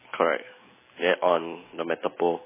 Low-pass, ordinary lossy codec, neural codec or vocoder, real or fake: 3.6 kHz; MP3, 16 kbps; none; real